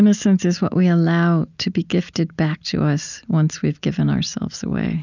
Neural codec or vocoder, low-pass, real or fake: none; 7.2 kHz; real